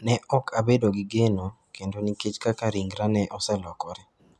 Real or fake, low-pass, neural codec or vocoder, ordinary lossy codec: real; none; none; none